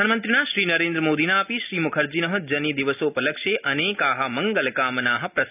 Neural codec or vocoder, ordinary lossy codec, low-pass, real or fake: none; none; 3.6 kHz; real